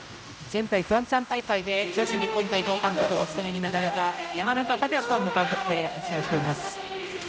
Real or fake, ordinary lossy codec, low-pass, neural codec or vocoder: fake; none; none; codec, 16 kHz, 0.5 kbps, X-Codec, HuBERT features, trained on general audio